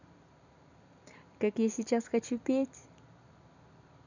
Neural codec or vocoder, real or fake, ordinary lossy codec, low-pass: vocoder, 44.1 kHz, 128 mel bands every 256 samples, BigVGAN v2; fake; none; 7.2 kHz